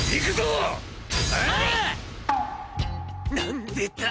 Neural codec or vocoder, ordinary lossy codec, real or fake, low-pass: none; none; real; none